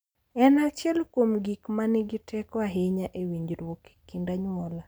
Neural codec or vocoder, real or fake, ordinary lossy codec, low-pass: none; real; none; none